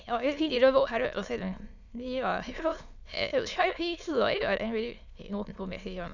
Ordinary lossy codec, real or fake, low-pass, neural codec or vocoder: none; fake; 7.2 kHz; autoencoder, 22.05 kHz, a latent of 192 numbers a frame, VITS, trained on many speakers